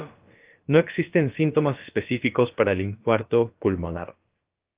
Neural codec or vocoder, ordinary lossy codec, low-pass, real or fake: codec, 16 kHz, about 1 kbps, DyCAST, with the encoder's durations; Opus, 32 kbps; 3.6 kHz; fake